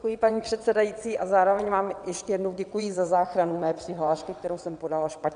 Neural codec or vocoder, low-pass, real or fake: vocoder, 22.05 kHz, 80 mel bands, WaveNeXt; 9.9 kHz; fake